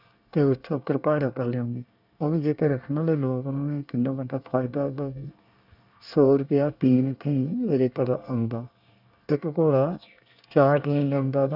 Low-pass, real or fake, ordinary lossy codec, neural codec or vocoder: 5.4 kHz; fake; none; codec, 24 kHz, 1 kbps, SNAC